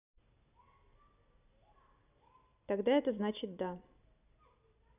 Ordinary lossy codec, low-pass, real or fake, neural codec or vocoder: none; 3.6 kHz; real; none